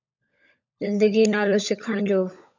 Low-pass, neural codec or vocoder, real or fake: 7.2 kHz; codec, 16 kHz, 16 kbps, FunCodec, trained on LibriTTS, 50 frames a second; fake